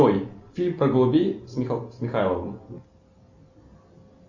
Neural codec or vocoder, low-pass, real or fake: none; 7.2 kHz; real